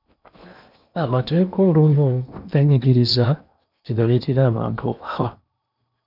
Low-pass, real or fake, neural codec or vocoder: 5.4 kHz; fake; codec, 16 kHz in and 24 kHz out, 0.8 kbps, FocalCodec, streaming, 65536 codes